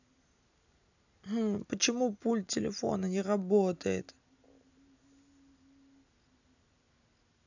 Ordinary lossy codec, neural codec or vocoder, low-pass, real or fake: none; none; 7.2 kHz; real